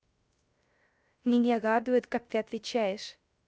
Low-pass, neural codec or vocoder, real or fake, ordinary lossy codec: none; codec, 16 kHz, 0.3 kbps, FocalCodec; fake; none